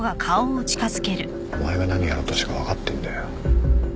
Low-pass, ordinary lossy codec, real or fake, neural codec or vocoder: none; none; real; none